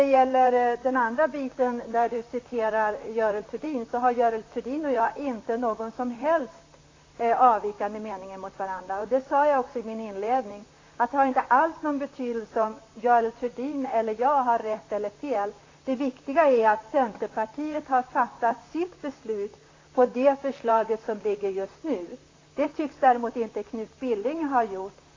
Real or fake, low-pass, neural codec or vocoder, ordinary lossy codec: fake; 7.2 kHz; vocoder, 44.1 kHz, 128 mel bands, Pupu-Vocoder; AAC, 32 kbps